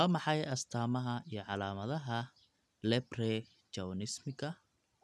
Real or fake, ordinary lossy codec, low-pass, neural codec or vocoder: real; none; none; none